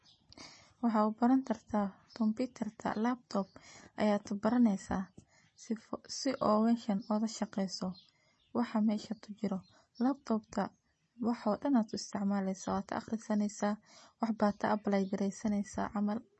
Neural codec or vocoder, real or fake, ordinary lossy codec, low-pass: none; real; MP3, 32 kbps; 9.9 kHz